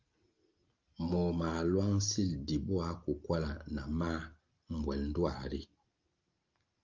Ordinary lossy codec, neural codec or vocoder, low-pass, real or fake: Opus, 32 kbps; none; 7.2 kHz; real